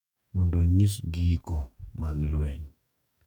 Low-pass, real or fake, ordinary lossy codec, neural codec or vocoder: 19.8 kHz; fake; none; codec, 44.1 kHz, 2.6 kbps, DAC